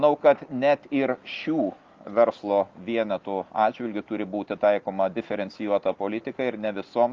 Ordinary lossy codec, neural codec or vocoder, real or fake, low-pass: Opus, 32 kbps; none; real; 7.2 kHz